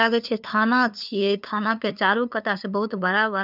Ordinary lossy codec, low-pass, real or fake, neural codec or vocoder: none; 5.4 kHz; fake; codec, 16 kHz, 2 kbps, FunCodec, trained on LibriTTS, 25 frames a second